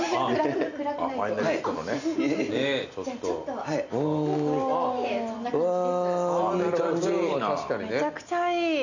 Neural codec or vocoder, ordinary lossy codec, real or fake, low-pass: none; none; real; 7.2 kHz